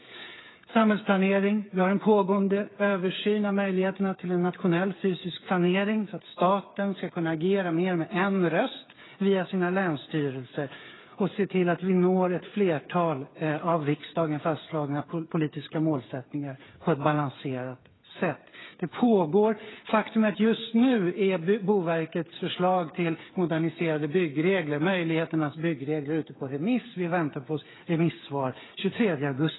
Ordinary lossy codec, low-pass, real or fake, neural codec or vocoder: AAC, 16 kbps; 7.2 kHz; fake; codec, 16 kHz, 8 kbps, FreqCodec, smaller model